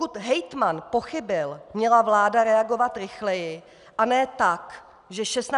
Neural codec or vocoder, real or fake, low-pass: none; real; 10.8 kHz